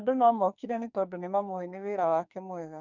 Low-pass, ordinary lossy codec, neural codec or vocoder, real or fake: 7.2 kHz; none; codec, 44.1 kHz, 2.6 kbps, SNAC; fake